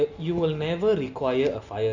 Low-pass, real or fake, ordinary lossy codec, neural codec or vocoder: 7.2 kHz; real; none; none